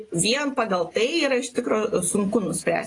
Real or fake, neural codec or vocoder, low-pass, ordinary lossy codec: real; none; 10.8 kHz; AAC, 32 kbps